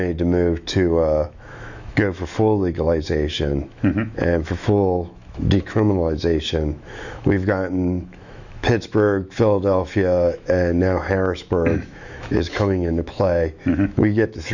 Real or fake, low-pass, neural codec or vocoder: fake; 7.2 kHz; autoencoder, 48 kHz, 128 numbers a frame, DAC-VAE, trained on Japanese speech